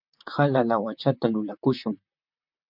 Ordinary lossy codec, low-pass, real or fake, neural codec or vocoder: MP3, 48 kbps; 5.4 kHz; fake; vocoder, 44.1 kHz, 128 mel bands, Pupu-Vocoder